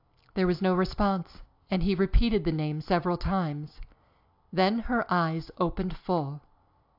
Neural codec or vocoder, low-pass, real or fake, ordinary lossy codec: none; 5.4 kHz; real; AAC, 48 kbps